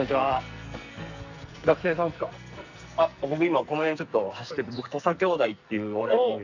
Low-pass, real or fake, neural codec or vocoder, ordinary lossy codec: 7.2 kHz; fake; codec, 44.1 kHz, 2.6 kbps, SNAC; none